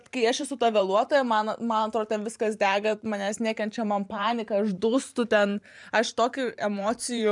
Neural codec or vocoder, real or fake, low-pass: vocoder, 44.1 kHz, 128 mel bands every 512 samples, BigVGAN v2; fake; 10.8 kHz